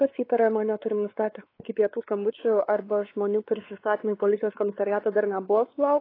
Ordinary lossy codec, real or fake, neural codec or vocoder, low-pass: AAC, 24 kbps; fake; codec, 16 kHz, 4 kbps, X-Codec, WavLM features, trained on Multilingual LibriSpeech; 5.4 kHz